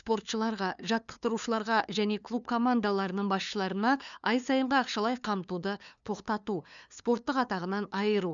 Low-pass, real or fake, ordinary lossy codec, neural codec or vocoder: 7.2 kHz; fake; none; codec, 16 kHz, 2 kbps, FunCodec, trained on LibriTTS, 25 frames a second